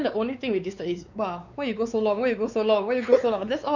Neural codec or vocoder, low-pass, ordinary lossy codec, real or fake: codec, 16 kHz, 4 kbps, X-Codec, WavLM features, trained on Multilingual LibriSpeech; 7.2 kHz; none; fake